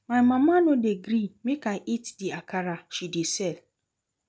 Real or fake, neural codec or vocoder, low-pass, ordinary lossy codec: real; none; none; none